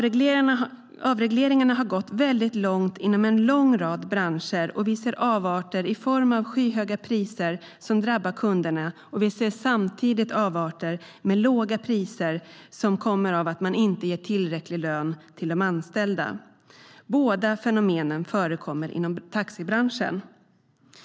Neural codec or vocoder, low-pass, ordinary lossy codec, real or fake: none; none; none; real